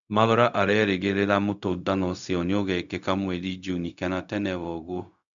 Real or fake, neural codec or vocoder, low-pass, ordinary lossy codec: fake; codec, 16 kHz, 0.4 kbps, LongCat-Audio-Codec; 7.2 kHz; MP3, 96 kbps